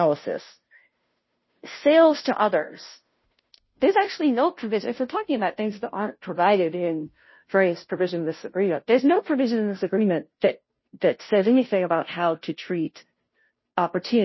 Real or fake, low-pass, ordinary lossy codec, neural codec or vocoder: fake; 7.2 kHz; MP3, 24 kbps; codec, 16 kHz, 0.5 kbps, FunCodec, trained on Chinese and English, 25 frames a second